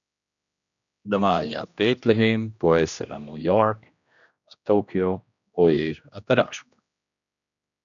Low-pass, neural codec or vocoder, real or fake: 7.2 kHz; codec, 16 kHz, 1 kbps, X-Codec, HuBERT features, trained on general audio; fake